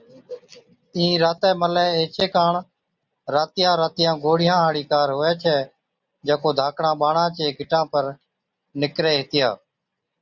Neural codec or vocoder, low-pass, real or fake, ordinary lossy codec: none; 7.2 kHz; real; Opus, 64 kbps